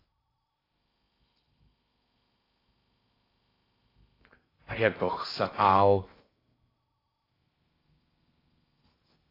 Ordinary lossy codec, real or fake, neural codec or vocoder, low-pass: AAC, 24 kbps; fake; codec, 16 kHz in and 24 kHz out, 0.6 kbps, FocalCodec, streaming, 4096 codes; 5.4 kHz